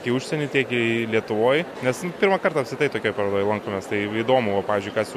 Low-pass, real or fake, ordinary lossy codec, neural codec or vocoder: 14.4 kHz; real; MP3, 64 kbps; none